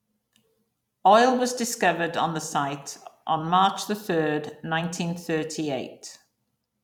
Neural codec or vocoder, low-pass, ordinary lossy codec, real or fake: vocoder, 44.1 kHz, 128 mel bands every 256 samples, BigVGAN v2; 19.8 kHz; none; fake